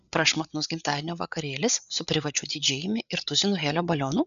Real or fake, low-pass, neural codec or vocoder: fake; 7.2 kHz; codec, 16 kHz, 8 kbps, FreqCodec, larger model